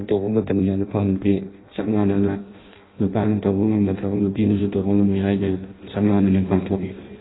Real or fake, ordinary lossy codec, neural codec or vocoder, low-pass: fake; AAC, 16 kbps; codec, 16 kHz in and 24 kHz out, 0.6 kbps, FireRedTTS-2 codec; 7.2 kHz